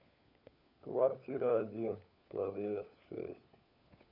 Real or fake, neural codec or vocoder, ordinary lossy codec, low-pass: fake; codec, 16 kHz, 4 kbps, FunCodec, trained on LibriTTS, 50 frames a second; none; 5.4 kHz